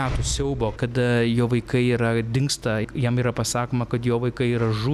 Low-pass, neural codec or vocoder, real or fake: 14.4 kHz; none; real